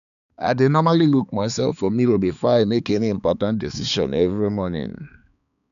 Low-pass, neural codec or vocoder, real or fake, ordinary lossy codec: 7.2 kHz; codec, 16 kHz, 4 kbps, X-Codec, HuBERT features, trained on balanced general audio; fake; none